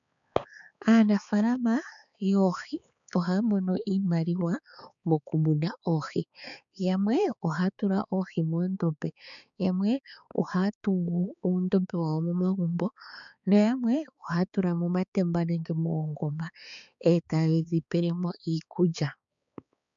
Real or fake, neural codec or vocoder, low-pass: fake; codec, 16 kHz, 4 kbps, X-Codec, HuBERT features, trained on balanced general audio; 7.2 kHz